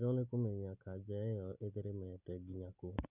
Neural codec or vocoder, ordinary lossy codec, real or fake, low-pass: none; none; real; 3.6 kHz